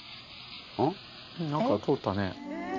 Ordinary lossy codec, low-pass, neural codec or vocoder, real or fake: none; 7.2 kHz; none; real